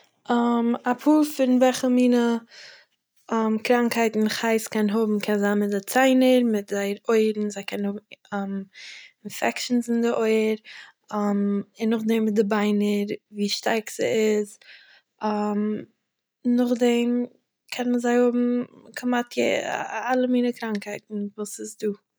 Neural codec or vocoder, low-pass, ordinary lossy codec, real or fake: none; none; none; real